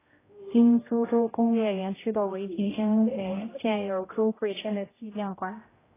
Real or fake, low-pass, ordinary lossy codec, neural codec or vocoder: fake; 3.6 kHz; AAC, 16 kbps; codec, 16 kHz, 0.5 kbps, X-Codec, HuBERT features, trained on general audio